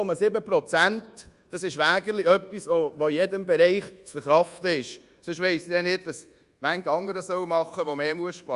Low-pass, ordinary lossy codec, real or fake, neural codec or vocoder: 10.8 kHz; Opus, 64 kbps; fake; codec, 24 kHz, 1.2 kbps, DualCodec